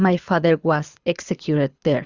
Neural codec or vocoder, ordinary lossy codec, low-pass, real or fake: codec, 24 kHz, 6 kbps, HILCodec; Opus, 64 kbps; 7.2 kHz; fake